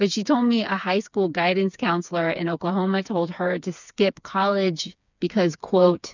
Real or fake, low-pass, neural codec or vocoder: fake; 7.2 kHz; codec, 16 kHz, 4 kbps, FreqCodec, smaller model